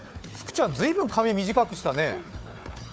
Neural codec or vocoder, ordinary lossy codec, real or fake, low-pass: codec, 16 kHz, 4 kbps, FunCodec, trained on Chinese and English, 50 frames a second; none; fake; none